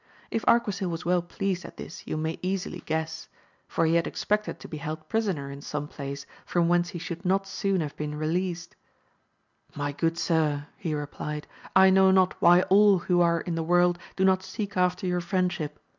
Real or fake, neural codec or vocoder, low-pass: real; none; 7.2 kHz